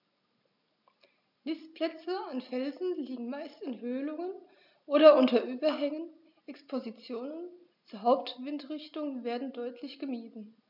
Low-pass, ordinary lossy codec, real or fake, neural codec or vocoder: 5.4 kHz; none; real; none